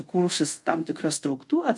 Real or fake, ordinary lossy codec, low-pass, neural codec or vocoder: fake; MP3, 64 kbps; 10.8 kHz; codec, 24 kHz, 0.5 kbps, DualCodec